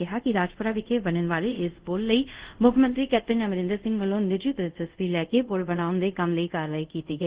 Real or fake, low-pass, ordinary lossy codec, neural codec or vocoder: fake; 3.6 kHz; Opus, 16 kbps; codec, 24 kHz, 0.5 kbps, DualCodec